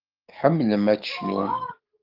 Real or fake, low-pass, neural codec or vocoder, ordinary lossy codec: fake; 5.4 kHz; codec, 44.1 kHz, 7.8 kbps, DAC; Opus, 32 kbps